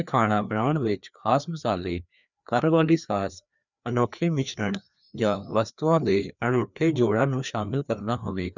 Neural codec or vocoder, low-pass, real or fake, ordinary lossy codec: codec, 16 kHz, 2 kbps, FreqCodec, larger model; 7.2 kHz; fake; none